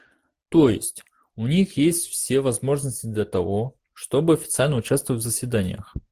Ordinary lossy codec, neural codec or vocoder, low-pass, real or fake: Opus, 24 kbps; none; 14.4 kHz; real